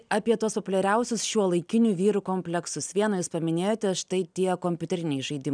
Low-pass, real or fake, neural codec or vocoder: 9.9 kHz; real; none